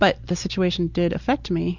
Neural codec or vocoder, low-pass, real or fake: none; 7.2 kHz; real